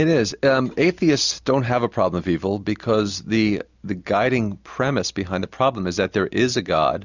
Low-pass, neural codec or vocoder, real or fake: 7.2 kHz; none; real